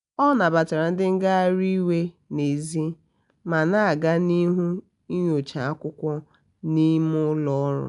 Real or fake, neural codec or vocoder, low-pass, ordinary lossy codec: real; none; 10.8 kHz; none